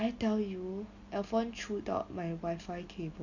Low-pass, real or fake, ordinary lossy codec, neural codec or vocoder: 7.2 kHz; real; none; none